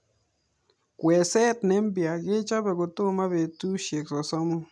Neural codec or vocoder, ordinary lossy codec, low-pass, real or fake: none; none; none; real